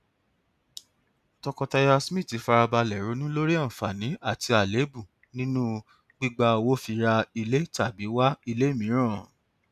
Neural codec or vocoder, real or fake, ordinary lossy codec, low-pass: none; real; none; 14.4 kHz